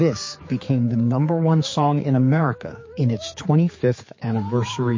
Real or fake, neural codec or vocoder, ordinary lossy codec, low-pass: fake; codec, 16 kHz, 4 kbps, X-Codec, HuBERT features, trained on general audio; MP3, 32 kbps; 7.2 kHz